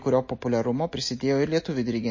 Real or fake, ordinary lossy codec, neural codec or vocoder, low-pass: real; MP3, 32 kbps; none; 7.2 kHz